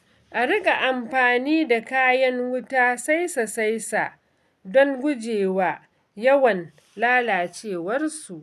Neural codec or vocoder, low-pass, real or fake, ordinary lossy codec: none; 14.4 kHz; real; none